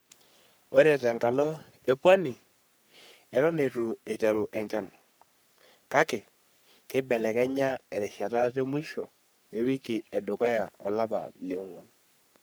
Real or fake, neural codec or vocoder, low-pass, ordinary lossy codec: fake; codec, 44.1 kHz, 3.4 kbps, Pupu-Codec; none; none